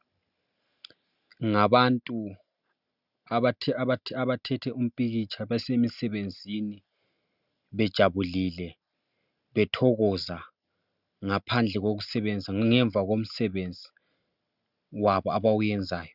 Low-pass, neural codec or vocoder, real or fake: 5.4 kHz; none; real